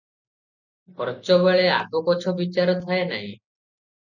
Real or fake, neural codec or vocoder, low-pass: real; none; 7.2 kHz